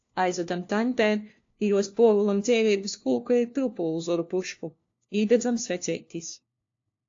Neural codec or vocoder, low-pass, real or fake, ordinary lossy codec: codec, 16 kHz, 1 kbps, FunCodec, trained on LibriTTS, 50 frames a second; 7.2 kHz; fake; AAC, 48 kbps